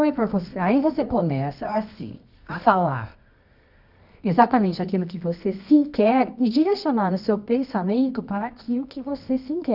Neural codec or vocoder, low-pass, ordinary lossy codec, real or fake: codec, 24 kHz, 0.9 kbps, WavTokenizer, medium music audio release; 5.4 kHz; none; fake